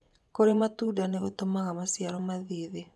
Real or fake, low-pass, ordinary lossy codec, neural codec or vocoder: fake; none; none; vocoder, 24 kHz, 100 mel bands, Vocos